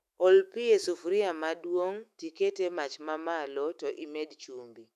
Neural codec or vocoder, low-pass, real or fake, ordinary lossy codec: autoencoder, 48 kHz, 128 numbers a frame, DAC-VAE, trained on Japanese speech; 14.4 kHz; fake; none